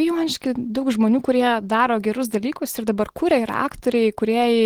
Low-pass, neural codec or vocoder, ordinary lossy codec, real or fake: 19.8 kHz; none; Opus, 16 kbps; real